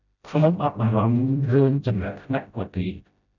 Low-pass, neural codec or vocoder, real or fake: 7.2 kHz; codec, 16 kHz, 0.5 kbps, FreqCodec, smaller model; fake